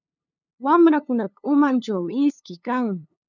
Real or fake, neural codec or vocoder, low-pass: fake; codec, 16 kHz, 2 kbps, FunCodec, trained on LibriTTS, 25 frames a second; 7.2 kHz